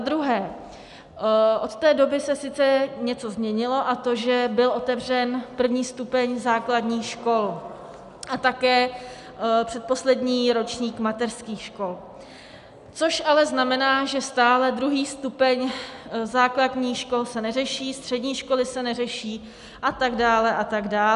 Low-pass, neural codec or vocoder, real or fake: 10.8 kHz; none; real